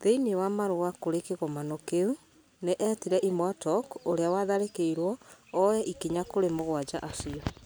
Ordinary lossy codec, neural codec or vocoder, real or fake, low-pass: none; none; real; none